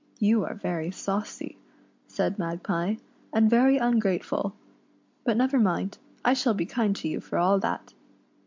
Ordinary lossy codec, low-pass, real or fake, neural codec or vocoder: MP3, 64 kbps; 7.2 kHz; real; none